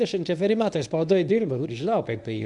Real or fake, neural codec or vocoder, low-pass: fake; codec, 24 kHz, 0.9 kbps, WavTokenizer, medium speech release version 1; 10.8 kHz